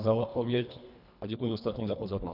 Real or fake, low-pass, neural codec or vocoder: fake; 5.4 kHz; codec, 24 kHz, 1.5 kbps, HILCodec